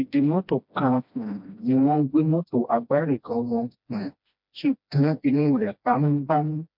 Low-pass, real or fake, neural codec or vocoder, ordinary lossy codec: 5.4 kHz; fake; codec, 16 kHz, 1 kbps, FreqCodec, smaller model; MP3, 48 kbps